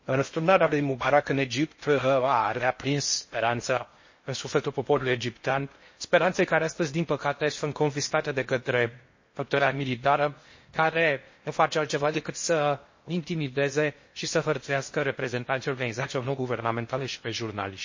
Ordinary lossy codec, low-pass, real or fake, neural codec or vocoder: MP3, 32 kbps; 7.2 kHz; fake; codec, 16 kHz in and 24 kHz out, 0.6 kbps, FocalCodec, streaming, 4096 codes